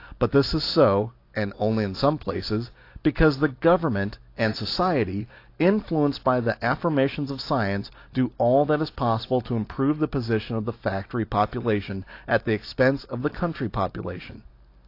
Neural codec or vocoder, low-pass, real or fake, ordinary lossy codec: none; 5.4 kHz; real; AAC, 32 kbps